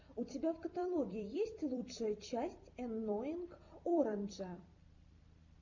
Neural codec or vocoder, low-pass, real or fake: vocoder, 44.1 kHz, 128 mel bands every 256 samples, BigVGAN v2; 7.2 kHz; fake